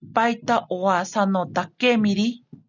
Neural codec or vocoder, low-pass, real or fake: none; 7.2 kHz; real